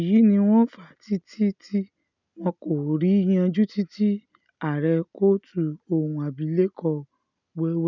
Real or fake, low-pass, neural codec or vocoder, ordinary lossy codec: real; 7.2 kHz; none; none